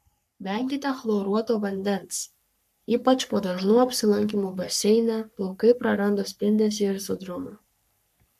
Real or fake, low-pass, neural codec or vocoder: fake; 14.4 kHz; codec, 44.1 kHz, 3.4 kbps, Pupu-Codec